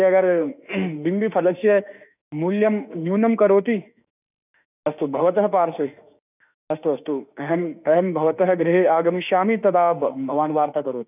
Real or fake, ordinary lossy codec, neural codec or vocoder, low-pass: fake; none; autoencoder, 48 kHz, 32 numbers a frame, DAC-VAE, trained on Japanese speech; 3.6 kHz